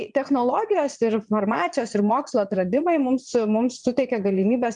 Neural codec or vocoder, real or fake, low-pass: vocoder, 22.05 kHz, 80 mel bands, Vocos; fake; 9.9 kHz